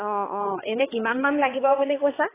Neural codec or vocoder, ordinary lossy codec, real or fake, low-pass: codec, 16 kHz, 4 kbps, X-Codec, HuBERT features, trained on balanced general audio; AAC, 16 kbps; fake; 3.6 kHz